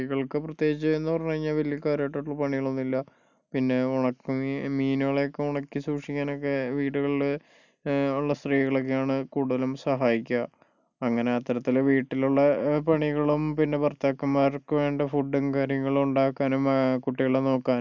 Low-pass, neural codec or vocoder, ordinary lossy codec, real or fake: 7.2 kHz; none; none; real